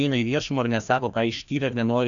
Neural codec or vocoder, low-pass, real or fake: codec, 16 kHz, 1 kbps, FreqCodec, larger model; 7.2 kHz; fake